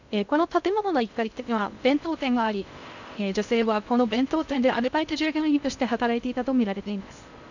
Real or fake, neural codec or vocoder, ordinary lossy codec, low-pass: fake; codec, 16 kHz in and 24 kHz out, 0.6 kbps, FocalCodec, streaming, 2048 codes; none; 7.2 kHz